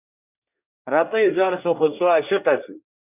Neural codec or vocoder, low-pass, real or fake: codec, 24 kHz, 1 kbps, SNAC; 3.6 kHz; fake